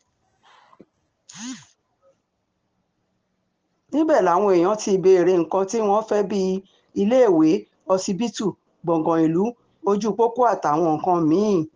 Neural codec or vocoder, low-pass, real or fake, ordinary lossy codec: none; 7.2 kHz; real; Opus, 16 kbps